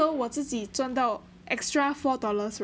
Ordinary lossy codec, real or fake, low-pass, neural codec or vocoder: none; real; none; none